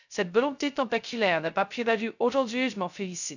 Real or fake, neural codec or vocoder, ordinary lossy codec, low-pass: fake; codec, 16 kHz, 0.2 kbps, FocalCodec; none; 7.2 kHz